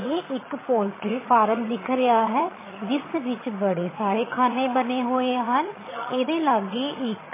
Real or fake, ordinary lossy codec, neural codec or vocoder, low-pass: fake; MP3, 16 kbps; vocoder, 22.05 kHz, 80 mel bands, HiFi-GAN; 3.6 kHz